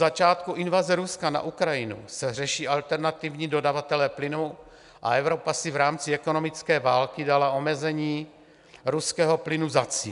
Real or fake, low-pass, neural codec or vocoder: real; 10.8 kHz; none